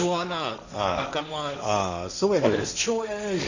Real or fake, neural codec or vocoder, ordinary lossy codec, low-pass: fake; codec, 16 kHz, 1.1 kbps, Voila-Tokenizer; none; 7.2 kHz